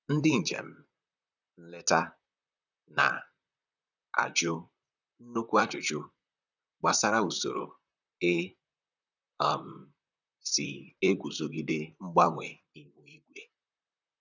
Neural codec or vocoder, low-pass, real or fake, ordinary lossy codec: vocoder, 44.1 kHz, 128 mel bands, Pupu-Vocoder; 7.2 kHz; fake; none